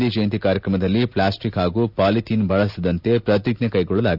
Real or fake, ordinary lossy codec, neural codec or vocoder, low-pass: real; none; none; 5.4 kHz